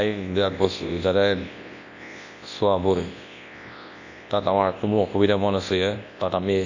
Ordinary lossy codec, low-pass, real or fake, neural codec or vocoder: MP3, 48 kbps; 7.2 kHz; fake; codec, 24 kHz, 0.9 kbps, WavTokenizer, large speech release